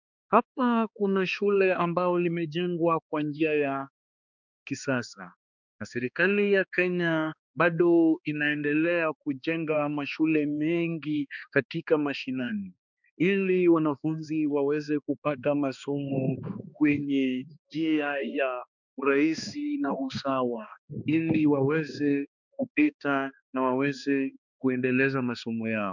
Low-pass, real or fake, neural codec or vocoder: 7.2 kHz; fake; codec, 16 kHz, 2 kbps, X-Codec, HuBERT features, trained on balanced general audio